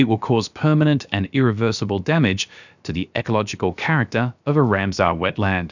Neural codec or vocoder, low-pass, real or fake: codec, 16 kHz, about 1 kbps, DyCAST, with the encoder's durations; 7.2 kHz; fake